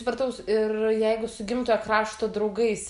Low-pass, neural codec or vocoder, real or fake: 10.8 kHz; none; real